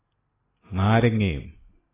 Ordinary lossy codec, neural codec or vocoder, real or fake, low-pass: AAC, 16 kbps; none; real; 3.6 kHz